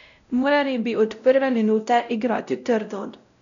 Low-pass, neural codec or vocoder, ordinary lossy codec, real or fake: 7.2 kHz; codec, 16 kHz, 0.5 kbps, X-Codec, WavLM features, trained on Multilingual LibriSpeech; none; fake